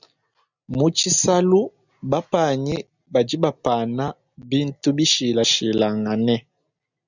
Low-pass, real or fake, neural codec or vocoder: 7.2 kHz; real; none